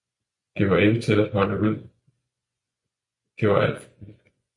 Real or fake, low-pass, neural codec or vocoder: fake; 10.8 kHz; vocoder, 24 kHz, 100 mel bands, Vocos